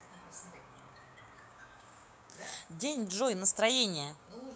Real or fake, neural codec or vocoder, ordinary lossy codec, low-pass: fake; codec, 16 kHz, 6 kbps, DAC; none; none